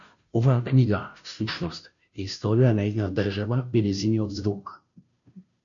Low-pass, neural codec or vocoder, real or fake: 7.2 kHz; codec, 16 kHz, 0.5 kbps, FunCodec, trained on Chinese and English, 25 frames a second; fake